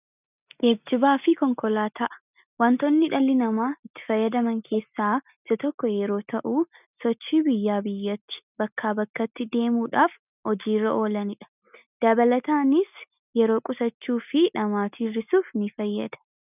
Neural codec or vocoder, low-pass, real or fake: none; 3.6 kHz; real